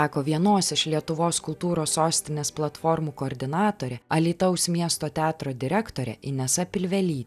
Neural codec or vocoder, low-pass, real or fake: none; 14.4 kHz; real